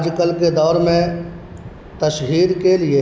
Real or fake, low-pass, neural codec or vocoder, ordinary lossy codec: real; none; none; none